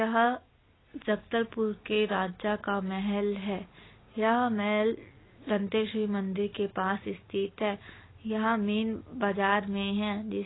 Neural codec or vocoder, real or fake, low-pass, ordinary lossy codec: none; real; 7.2 kHz; AAC, 16 kbps